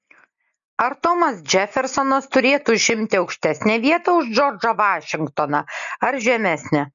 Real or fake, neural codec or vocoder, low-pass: real; none; 7.2 kHz